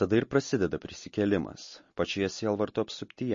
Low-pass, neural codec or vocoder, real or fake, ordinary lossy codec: 7.2 kHz; none; real; MP3, 32 kbps